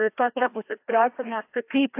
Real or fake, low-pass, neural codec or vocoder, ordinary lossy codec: fake; 3.6 kHz; codec, 16 kHz, 1 kbps, FreqCodec, larger model; AAC, 24 kbps